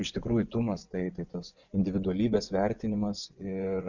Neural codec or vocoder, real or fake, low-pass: vocoder, 24 kHz, 100 mel bands, Vocos; fake; 7.2 kHz